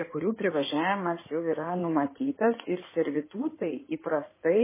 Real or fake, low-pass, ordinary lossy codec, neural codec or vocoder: fake; 3.6 kHz; MP3, 16 kbps; codec, 16 kHz in and 24 kHz out, 2.2 kbps, FireRedTTS-2 codec